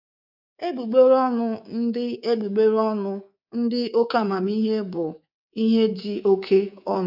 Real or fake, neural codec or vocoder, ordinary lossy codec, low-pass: fake; codec, 24 kHz, 3.1 kbps, DualCodec; none; 5.4 kHz